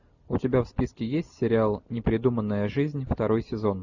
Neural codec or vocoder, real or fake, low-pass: none; real; 7.2 kHz